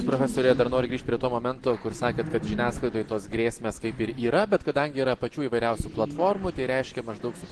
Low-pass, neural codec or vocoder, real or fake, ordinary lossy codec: 10.8 kHz; none; real; Opus, 16 kbps